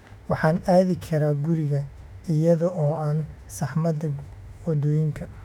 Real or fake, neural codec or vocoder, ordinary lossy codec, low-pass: fake; autoencoder, 48 kHz, 32 numbers a frame, DAC-VAE, trained on Japanese speech; none; 19.8 kHz